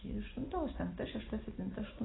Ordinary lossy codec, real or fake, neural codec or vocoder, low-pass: AAC, 16 kbps; real; none; 7.2 kHz